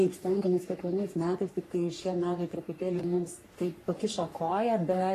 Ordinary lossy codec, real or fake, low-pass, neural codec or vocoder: AAC, 48 kbps; fake; 14.4 kHz; codec, 44.1 kHz, 3.4 kbps, Pupu-Codec